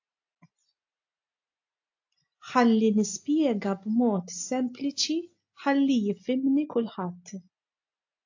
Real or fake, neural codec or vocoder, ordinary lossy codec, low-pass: real; none; AAC, 48 kbps; 7.2 kHz